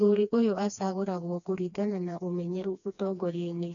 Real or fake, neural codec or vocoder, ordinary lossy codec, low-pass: fake; codec, 16 kHz, 2 kbps, FreqCodec, smaller model; none; 7.2 kHz